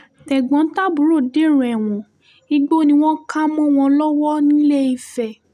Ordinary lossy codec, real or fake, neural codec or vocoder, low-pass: none; real; none; 14.4 kHz